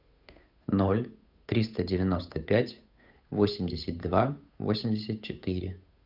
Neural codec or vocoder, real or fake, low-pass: codec, 16 kHz, 8 kbps, FunCodec, trained on Chinese and English, 25 frames a second; fake; 5.4 kHz